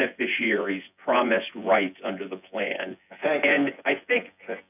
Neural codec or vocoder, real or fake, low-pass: vocoder, 24 kHz, 100 mel bands, Vocos; fake; 3.6 kHz